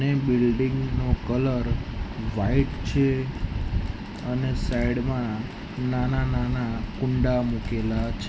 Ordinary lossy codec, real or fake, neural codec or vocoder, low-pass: none; real; none; none